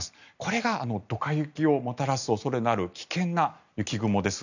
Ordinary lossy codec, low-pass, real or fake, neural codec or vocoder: none; 7.2 kHz; real; none